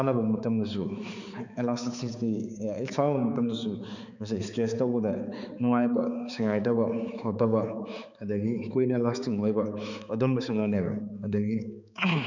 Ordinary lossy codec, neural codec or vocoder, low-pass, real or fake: none; codec, 16 kHz, 4 kbps, X-Codec, HuBERT features, trained on balanced general audio; 7.2 kHz; fake